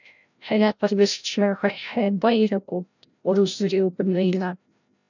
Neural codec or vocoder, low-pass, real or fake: codec, 16 kHz, 0.5 kbps, FreqCodec, larger model; 7.2 kHz; fake